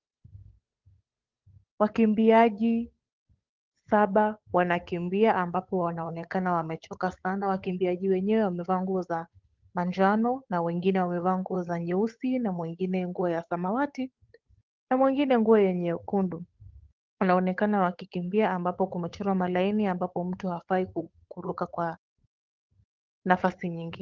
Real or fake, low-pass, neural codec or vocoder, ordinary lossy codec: fake; 7.2 kHz; codec, 16 kHz, 8 kbps, FunCodec, trained on Chinese and English, 25 frames a second; Opus, 32 kbps